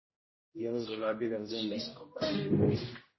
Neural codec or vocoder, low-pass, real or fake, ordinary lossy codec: codec, 16 kHz, 0.5 kbps, X-Codec, HuBERT features, trained on general audio; 7.2 kHz; fake; MP3, 24 kbps